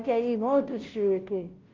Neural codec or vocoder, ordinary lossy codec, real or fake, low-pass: codec, 16 kHz, 0.5 kbps, FunCodec, trained on Chinese and English, 25 frames a second; Opus, 24 kbps; fake; 7.2 kHz